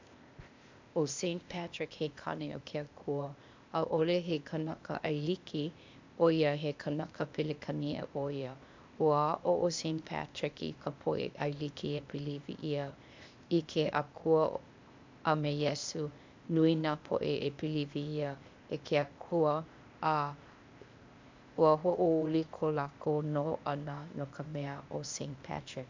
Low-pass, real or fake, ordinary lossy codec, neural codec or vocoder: 7.2 kHz; fake; MP3, 64 kbps; codec, 16 kHz, 0.8 kbps, ZipCodec